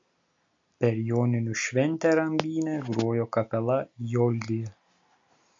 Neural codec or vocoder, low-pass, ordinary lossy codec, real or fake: none; 7.2 kHz; MP3, 48 kbps; real